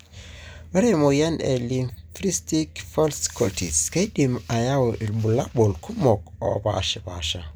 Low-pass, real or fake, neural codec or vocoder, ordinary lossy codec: none; real; none; none